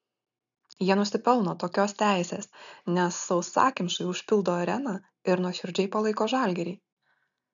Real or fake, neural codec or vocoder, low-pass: real; none; 7.2 kHz